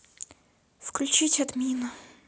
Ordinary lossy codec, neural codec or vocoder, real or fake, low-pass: none; none; real; none